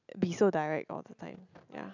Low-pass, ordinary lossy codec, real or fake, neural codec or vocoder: 7.2 kHz; none; real; none